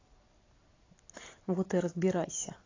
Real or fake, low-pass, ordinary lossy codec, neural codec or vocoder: real; 7.2 kHz; AAC, 32 kbps; none